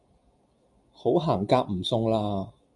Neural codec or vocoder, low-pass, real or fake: none; 10.8 kHz; real